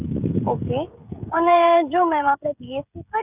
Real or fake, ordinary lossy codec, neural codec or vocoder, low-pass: fake; none; codec, 16 kHz, 16 kbps, FreqCodec, smaller model; 3.6 kHz